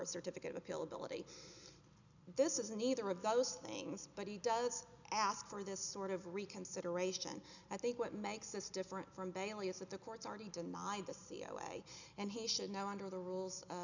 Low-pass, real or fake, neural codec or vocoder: 7.2 kHz; real; none